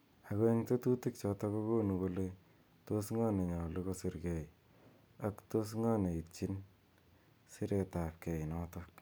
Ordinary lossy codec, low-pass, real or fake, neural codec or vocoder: none; none; real; none